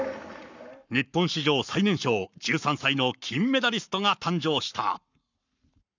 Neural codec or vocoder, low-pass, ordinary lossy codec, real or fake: codec, 44.1 kHz, 7.8 kbps, Pupu-Codec; 7.2 kHz; none; fake